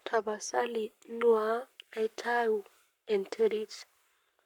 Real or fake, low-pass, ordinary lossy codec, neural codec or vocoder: fake; 19.8 kHz; none; codec, 44.1 kHz, 7.8 kbps, Pupu-Codec